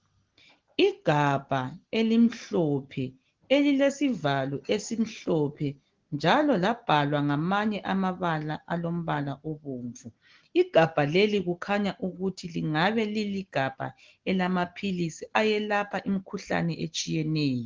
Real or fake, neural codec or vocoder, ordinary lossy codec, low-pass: real; none; Opus, 16 kbps; 7.2 kHz